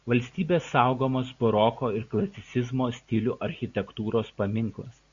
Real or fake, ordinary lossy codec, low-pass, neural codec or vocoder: real; Opus, 64 kbps; 7.2 kHz; none